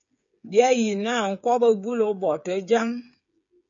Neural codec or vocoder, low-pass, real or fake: codec, 16 kHz, 8 kbps, FreqCodec, smaller model; 7.2 kHz; fake